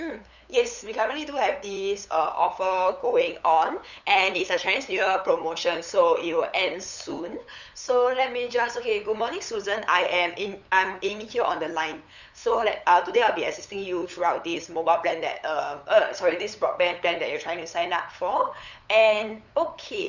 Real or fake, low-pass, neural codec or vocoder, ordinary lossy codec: fake; 7.2 kHz; codec, 16 kHz, 8 kbps, FunCodec, trained on LibriTTS, 25 frames a second; none